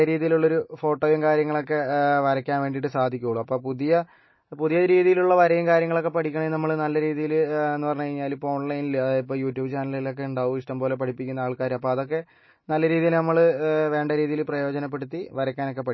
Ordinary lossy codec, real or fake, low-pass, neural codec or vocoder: MP3, 24 kbps; real; 7.2 kHz; none